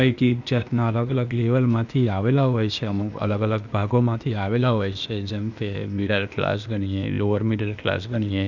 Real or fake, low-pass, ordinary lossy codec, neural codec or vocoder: fake; 7.2 kHz; none; codec, 16 kHz, 0.8 kbps, ZipCodec